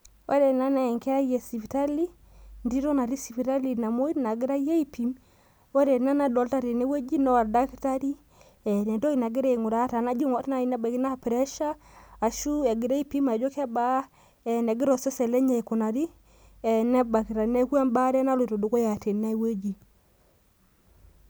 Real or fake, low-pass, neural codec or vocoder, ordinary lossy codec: real; none; none; none